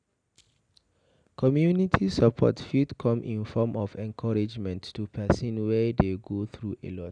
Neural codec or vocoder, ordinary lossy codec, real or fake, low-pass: none; none; real; 9.9 kHz